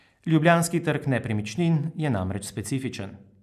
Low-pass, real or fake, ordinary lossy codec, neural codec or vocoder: 14.4 kHz; real; none; none